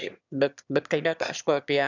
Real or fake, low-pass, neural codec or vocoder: fake; 7.2 kHz; autoencoder, 22.05 kHz, a latent of 192 numbers a frame, VITS, trained on one speaker